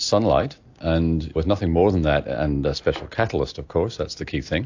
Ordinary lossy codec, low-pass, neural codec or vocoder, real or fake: AAC, 48 kbps; 7.2 kHz; none; real